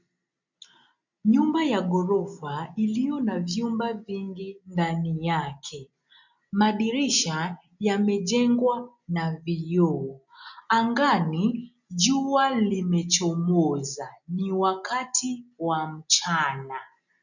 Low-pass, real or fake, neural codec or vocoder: 7.2 kHz; real; none